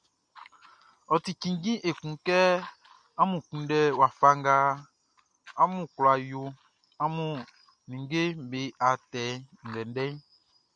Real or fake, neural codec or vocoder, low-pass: real; none; 9.9 kHz